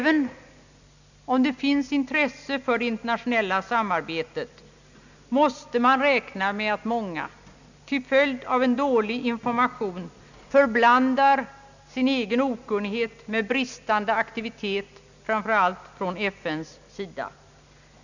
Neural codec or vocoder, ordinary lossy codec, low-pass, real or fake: none; none; 7.2 kHz; real